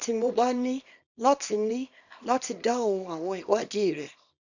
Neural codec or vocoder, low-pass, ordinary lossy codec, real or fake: codec, 24 kHz, 0.9 kbps, WavTokenizer, small release; 7.2 kHz; none; fake